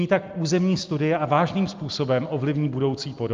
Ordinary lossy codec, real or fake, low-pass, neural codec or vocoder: Opus, 24 kbps; real; 7.2 kHz; none